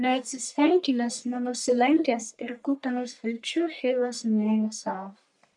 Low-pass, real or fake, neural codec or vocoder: 10.8 kHz; fake; codec, 44.1 kHz, 1.7 kbps, Pupu-Codec